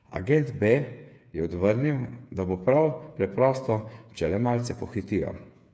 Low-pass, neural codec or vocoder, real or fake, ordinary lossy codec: none; codec, 16 kHz, 8 kbps, FreqCodec, smaller model; fake; none